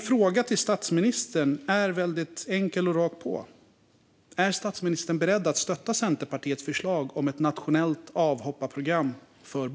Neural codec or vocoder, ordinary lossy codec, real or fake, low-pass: none; none; real; none